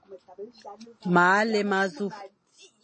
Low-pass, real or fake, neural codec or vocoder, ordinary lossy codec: 9.9 kHz; real; none; MP3, 32 kbps